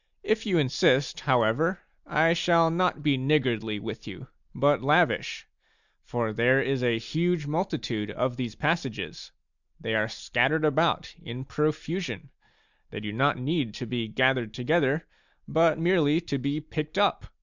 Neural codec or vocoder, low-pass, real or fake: none; 7.2 kHz; real